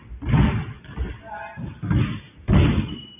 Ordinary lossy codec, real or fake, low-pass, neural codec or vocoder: AAC, 32 kbps; real; 3.6 kHz; none